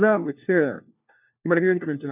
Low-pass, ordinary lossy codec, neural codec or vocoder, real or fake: 3.6 kHz; none; codec, 16 kHz, 1 kbps, FunCodec, trained on LibriTTS, 50 frames a second; fake